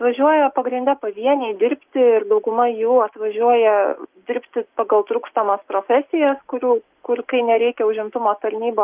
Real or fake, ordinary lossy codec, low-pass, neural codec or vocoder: real; Opus, 32 kbps; 3.6 kHz; none